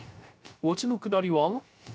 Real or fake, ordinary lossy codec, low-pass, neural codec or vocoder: fake; none; none; codec, 16 kHz, 0.3 kbps, FocalCodec